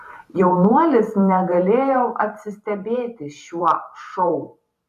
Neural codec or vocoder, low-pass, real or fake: vocoder, 44.1 kHz, 128 mel bands every 512 samples, BigVGAN v2; 14.4 kHz; fake